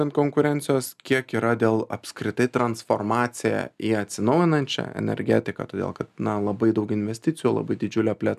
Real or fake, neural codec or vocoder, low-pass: real; none; 14.4 kHz